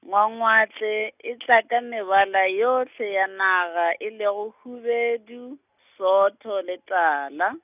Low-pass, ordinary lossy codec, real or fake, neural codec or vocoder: 3.6 kHz; none; real; none